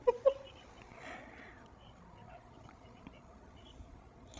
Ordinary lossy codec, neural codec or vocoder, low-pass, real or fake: none; codec, 16 kHz, 16 kbps, FreqCodec, larger model; none; fake